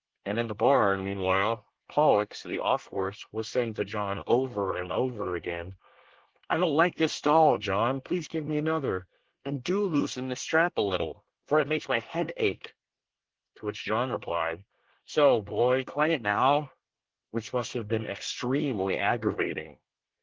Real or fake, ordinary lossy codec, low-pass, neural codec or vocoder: fake; Opus, 16 kbps; 7.2 kHz; codec, 24 kHz, 1 kbps, SNAC